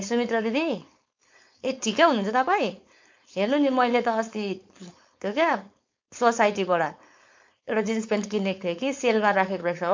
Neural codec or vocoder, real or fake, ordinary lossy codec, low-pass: codec, 16 kHz, 4.8 kbps, FACodec; fake; MP3, 48 kbps; 7.2 kHz